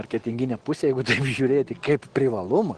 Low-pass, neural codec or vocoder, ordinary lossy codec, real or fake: 9.9 kHz; none; Opus, 16 kbps; real